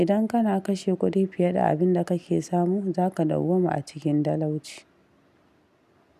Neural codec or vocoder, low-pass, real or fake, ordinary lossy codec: none; 14.4 kHz; real; none